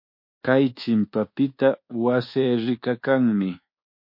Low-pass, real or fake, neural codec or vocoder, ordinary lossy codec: 5.4 kHz; fake; codec, 24 kHz, 1.2 kbps, DualCodec; MP3, 32 kbps